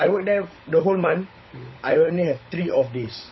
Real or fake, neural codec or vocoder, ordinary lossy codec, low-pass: fake; codec, 16 kHz, 16 kbps, FunCodec, trained on LibriTTS, 50 frames a second; MP3, 24 kbps; 7.2 kHz